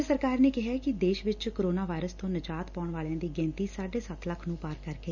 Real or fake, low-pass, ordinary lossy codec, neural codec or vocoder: real; 7.2 kHz; none; none